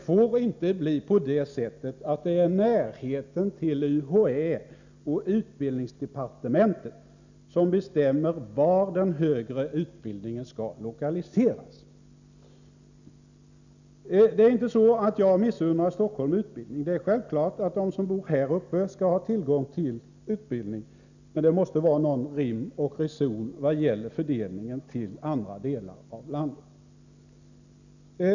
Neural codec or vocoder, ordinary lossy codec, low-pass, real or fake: none; none; 7.2 kHz; real